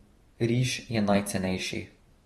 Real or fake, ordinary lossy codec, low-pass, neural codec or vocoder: fake; AAC, 32 kbps; 19.8 kHz; vocoder, 44.1 kHz, 128 mel bands every 512 samples, BigVGAN v2